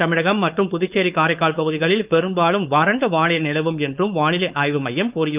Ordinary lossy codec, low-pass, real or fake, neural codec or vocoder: Opus, 24 kbps; 3.6 kHz; fake; codec, 16 kHz, 4.8 kbps, FACodec